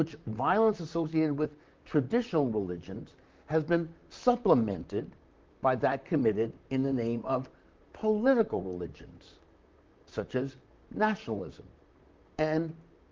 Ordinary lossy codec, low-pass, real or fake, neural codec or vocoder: Opus, 24 kbps; 7.2 kHz; fake; vocoder, 44.1 kHz, 128 mel bands, Pupu-Vocoder